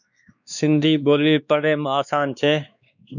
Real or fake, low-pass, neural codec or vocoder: fake; 7.2 kHz; codec, 16 kHz, 2 kbps, X-Codec, WavLM features, trained on Multilingual LibriSpeech